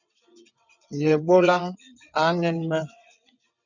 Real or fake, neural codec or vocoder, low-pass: fake; vocoder, 22.05 kHz, 80 mel bands, WaveNeXt; 7.2 kHz